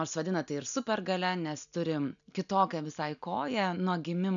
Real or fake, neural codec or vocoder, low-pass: real; none; 7.2 kHz